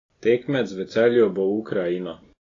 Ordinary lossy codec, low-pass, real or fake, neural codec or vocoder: AAC, 32 kbps; 7.2 kHz; real; none